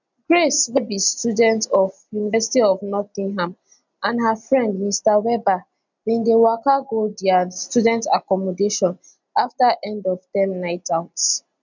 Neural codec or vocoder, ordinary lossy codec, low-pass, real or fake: none; none; 7.2 kHz; real